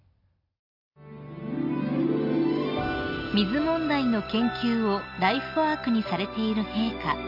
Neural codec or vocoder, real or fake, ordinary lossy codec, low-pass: none; real; none; 5.4 kHz